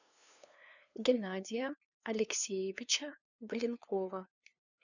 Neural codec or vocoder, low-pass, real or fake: codec, 16 kHz, 2 kbps, FunCodec, trained on LibriTTS, 25 frames a second; 7.2 kHz; fake